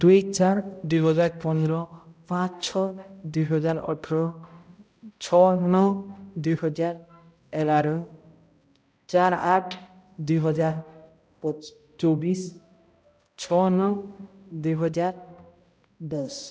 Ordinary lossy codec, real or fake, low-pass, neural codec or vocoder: none; fake; none; codec, 16 kHz, 0.5 kbps, X-Codec, HuBERT features, trained on balanced general audio